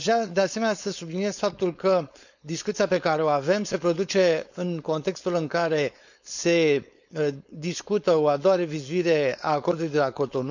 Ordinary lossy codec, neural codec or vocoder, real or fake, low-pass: none; codec, 16 kHz, 4.8 kbps, FACodec; fake; 7.2 kHz